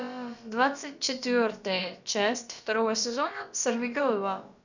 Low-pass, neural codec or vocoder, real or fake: 7.2 kHz; codec, 16 kHz, about 1 kbps, DyCAST, with the encoder's durations; fake